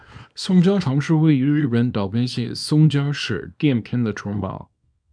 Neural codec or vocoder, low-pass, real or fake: codec, 24 kHz, 0.9 kbps, WavTokenizer, small release; 9.9 kHz; fake